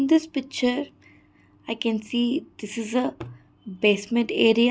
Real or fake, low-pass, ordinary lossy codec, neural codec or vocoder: real; none; none; none